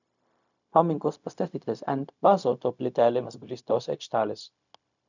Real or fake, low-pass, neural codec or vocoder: fake; 7.2 kHz; codec, 16 kHz, 0.4 kbps, LongCat-Audio-Codec